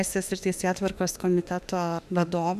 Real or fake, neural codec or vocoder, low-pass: fake; autoencoder, 48 kHz, 32 numbers a frame, DAC-VAE, trained on Japanese speech; 14.4 kHz